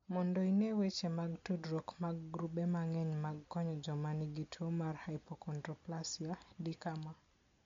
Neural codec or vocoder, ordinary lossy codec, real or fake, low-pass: none; MP3, 48 kbps; real; 7.2 kHz